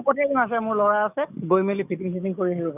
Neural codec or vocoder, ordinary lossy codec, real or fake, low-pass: codec, 44.1 kHz, 7.8 kbps, DAC; none; fake; 3.6 kHz